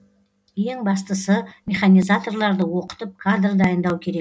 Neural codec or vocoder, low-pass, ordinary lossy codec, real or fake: none; none; none; real